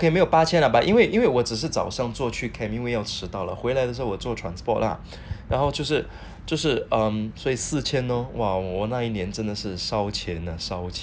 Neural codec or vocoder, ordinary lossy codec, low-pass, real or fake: none; none; none; real